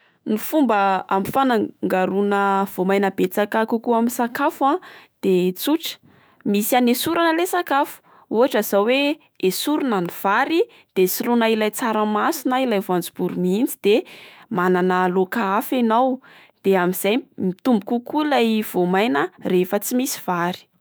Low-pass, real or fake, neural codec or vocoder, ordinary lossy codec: none; fake; autoencoder, 48 kHz, 128 numbers a frame, DAC-VAE, trained on Japanese speech; none